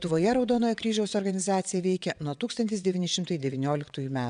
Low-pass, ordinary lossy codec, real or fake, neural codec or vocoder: 9.9 kHz; MP3, 96 kbps; fake; vocoder, 22.05 kHz, 80 mel bands, WaveNeXt